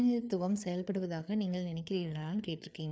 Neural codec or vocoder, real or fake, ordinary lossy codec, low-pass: codec, 16 kHz, 4 kbps, FreqCodec, larger model; fake; none; none